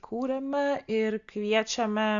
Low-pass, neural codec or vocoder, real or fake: 7.2 kHz; none; real